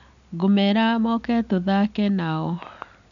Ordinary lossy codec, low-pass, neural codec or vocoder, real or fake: none; 7.2 kHz; none; real